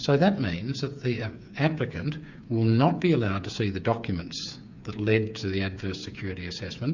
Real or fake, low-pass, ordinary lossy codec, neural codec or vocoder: fake; 7.2 kHz; Opus, 64 kbps; codec, 16 kHz, 8 kbps, FreqCodec, smaller model